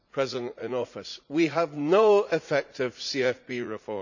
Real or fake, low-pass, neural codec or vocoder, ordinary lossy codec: fake; 7.2 kHz; vocoder, 44.1 kHz, 80 mel bands, Vocos; none